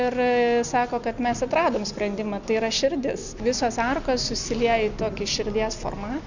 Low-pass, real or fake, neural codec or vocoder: 7.2 kHz; real; none